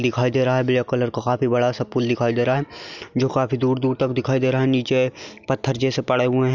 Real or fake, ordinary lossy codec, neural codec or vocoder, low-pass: real; none; none; 7.2 kHz